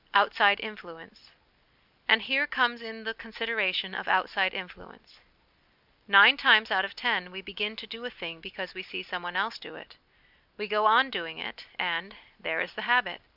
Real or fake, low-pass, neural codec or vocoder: real; 5.4 kHz; none